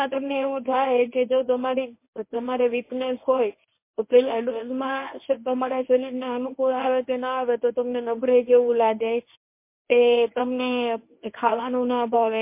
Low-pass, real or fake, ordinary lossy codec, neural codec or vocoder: 3.6 kHz; fake; MP3, 24 kbps; codec, 24 kHz, 0.9 kbps, WavTokenizer, medium speech release version 1